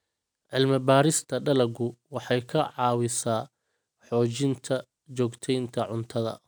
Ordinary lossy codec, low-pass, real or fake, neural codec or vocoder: none; none; real; none